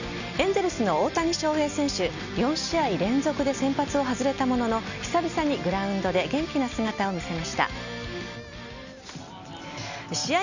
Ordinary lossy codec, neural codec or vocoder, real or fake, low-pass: none; none; real; 7.2 kHz